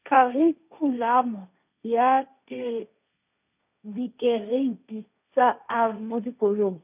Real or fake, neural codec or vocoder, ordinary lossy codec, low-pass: fake; codec, 16 kHz, 1.1 kbps, Voila-Tokenizer; none; 3.6 kHz